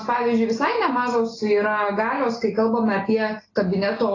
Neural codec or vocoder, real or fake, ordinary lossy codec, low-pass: none; real; AAC, 32 kbps; 7.2 kHz